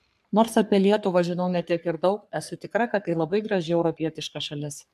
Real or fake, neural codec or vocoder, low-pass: fake; codec, 44.1 kHz, 3.4 kbps, Pupu-Codec; 14.4 kHz